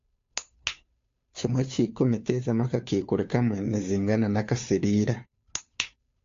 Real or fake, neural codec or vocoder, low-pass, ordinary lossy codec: fake; codec, 16 kHz, 2 kbps, FunCodec, trained on Chinese and English, 25 frames a second; 7.2 kHz; AAC, 48 kbps